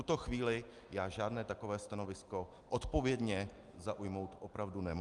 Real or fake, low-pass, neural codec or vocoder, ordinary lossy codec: real; 10.8 kHz; none; Opus, 32 kbps